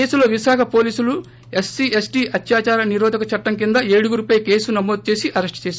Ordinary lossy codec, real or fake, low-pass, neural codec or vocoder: none; real; none; none